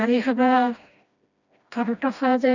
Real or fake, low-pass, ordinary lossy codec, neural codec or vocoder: fake; 7.2 kHz; none; codec, 16 kHz, 1 kbps, FreqCodec, smaller model